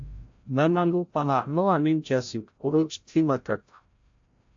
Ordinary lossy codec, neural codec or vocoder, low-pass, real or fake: AAC, 64 kbps; codec, 16 kHz, 0.5 kbps, FreqCodec, larger model; 7.2 kHz; fake